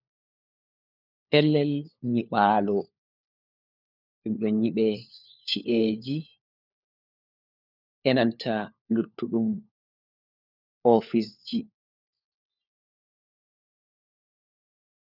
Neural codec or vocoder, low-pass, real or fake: codec, 16 kHz, 4 kbps, FunCodec, trained on LibriTTS, 50 frames a second; 5.4 kHz; fake